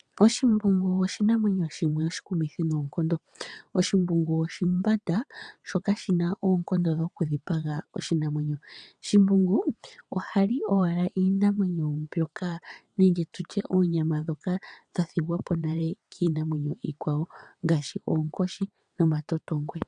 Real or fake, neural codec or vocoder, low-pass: fake; vocoder, 22.05 kHz, 80 mel bands, WaveNeXt; 9.9 kHz